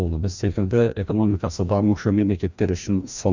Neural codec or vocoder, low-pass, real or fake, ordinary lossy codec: codec, 16 kHz, 1 kbps, FreqCodec, larger model; 7.2 kHz; fake; Opus, 64 kbps